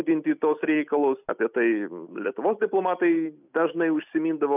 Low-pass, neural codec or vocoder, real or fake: 3.6 kHz; none; real